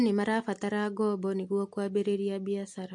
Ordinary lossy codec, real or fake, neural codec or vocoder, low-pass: MP3, 48 kbps; real; none; 10.8 kHz